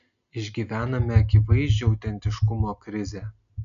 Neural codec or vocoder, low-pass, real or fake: none; 7.2 kHz; real